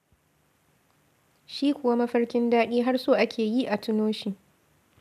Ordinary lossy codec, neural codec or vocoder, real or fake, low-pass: none; none; real; 14.4 kHz